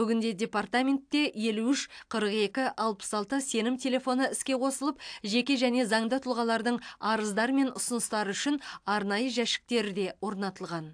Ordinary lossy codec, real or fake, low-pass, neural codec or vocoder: AAC, 64 kbps; real; 9.9 kHz; none